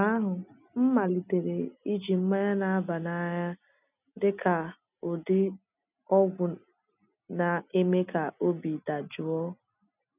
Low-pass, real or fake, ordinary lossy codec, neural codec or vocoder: 3.6 kHz; real; none; none